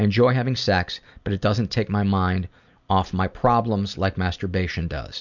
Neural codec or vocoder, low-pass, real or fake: none; 7.2 kHz; real